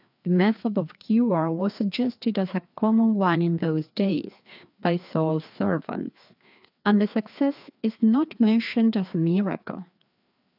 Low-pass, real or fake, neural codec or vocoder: 5.4 kHz; fake; codec, 16 kHz, 2 kbps, FreqCodec, larger model